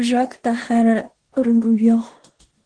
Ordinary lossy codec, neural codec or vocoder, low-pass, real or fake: Opus, 16 kbps; codec, 16 kHz in and 24 kHz out, 0.9 kbps, LongCat-Audio-Codec, four codebook decoder; 9.9 kHz; fake